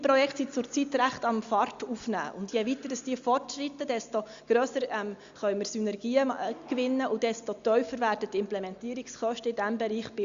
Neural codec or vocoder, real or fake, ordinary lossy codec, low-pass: none; real; Opus, 64 kbps; 7.2 kHz